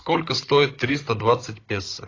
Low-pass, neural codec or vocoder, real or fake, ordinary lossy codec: 7.2 kHz; codec, 16 kHz, 16 kbps, FunCodec, trained on Chinese and English, 50 frames a second; fake; AAC, 32 kbps